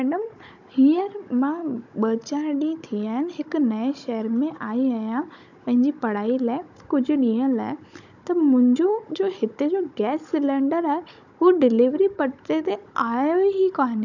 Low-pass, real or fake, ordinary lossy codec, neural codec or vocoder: 7.2 kHz; fake; none; codec, 16 kHz, 16 kbps, FunCodec, trained on Chinese and English, 50 frames a second